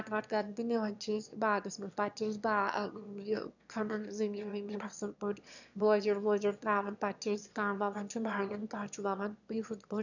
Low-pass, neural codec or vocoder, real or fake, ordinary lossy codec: 7.2 kHz; autoencoder, 22.05 kHz, a latent of 192 numbers a frame, VITS, trained on one speaker; fake; none